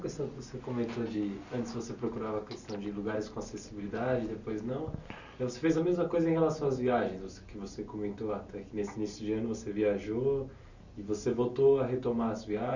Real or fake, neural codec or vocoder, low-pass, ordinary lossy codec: real; none; 7.2 kHz; none